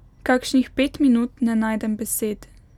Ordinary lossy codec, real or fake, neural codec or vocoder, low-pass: none; real; none; 19.8 kHz